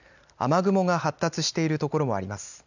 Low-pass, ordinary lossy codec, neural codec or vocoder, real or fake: 7.2 kHz; none; none; real